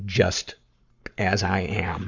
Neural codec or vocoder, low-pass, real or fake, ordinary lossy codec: codec, 44.1 kHz, 7.8 kbps, Pupu-Codec; 7.2 kHz; fake; Opus, 64 kbps